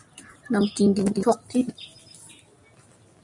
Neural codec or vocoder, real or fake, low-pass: none; real; 10.8 kHz